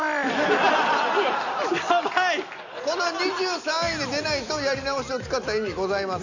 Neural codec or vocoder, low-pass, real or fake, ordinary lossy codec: none; 7.2 kHz; real; none